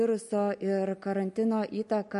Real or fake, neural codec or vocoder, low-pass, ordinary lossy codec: real; none; 14.4 kHz; MP3, 48 kbps